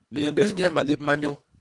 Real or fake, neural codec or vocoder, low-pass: fake; codec, 24 kHz, 1.5 kbps, HILCodec; 10.8 kHz